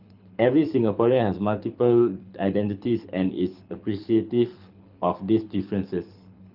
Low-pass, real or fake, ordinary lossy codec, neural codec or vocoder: 5.4 kHz; fake; Opus, 24 kbps; codec, 24 kHz, 6 kbps, HILCodec